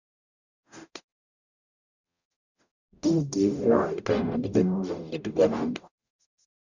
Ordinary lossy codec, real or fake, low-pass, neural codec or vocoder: none; fake; 7.2 kHz; codec, 44.1 kHz, 0.9 kbps, DAC